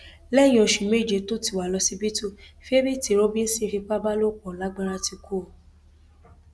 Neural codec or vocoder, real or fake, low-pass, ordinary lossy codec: none; real; none; none